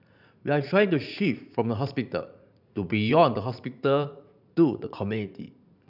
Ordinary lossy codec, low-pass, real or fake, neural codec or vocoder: none; 5.4 kHz; fake; vocoder, 44.1 kHz, 80 mel bands, Vocos